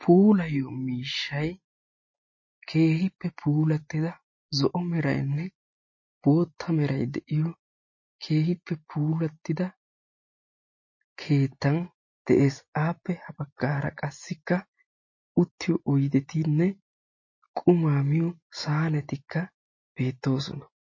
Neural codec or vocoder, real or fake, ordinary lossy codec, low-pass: none; real; MP3, 32 kbps; 7.2 kHz